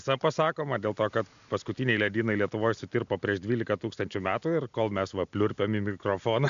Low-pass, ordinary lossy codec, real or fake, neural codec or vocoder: 7.2 kHz; AAC, 96 kbps; real; none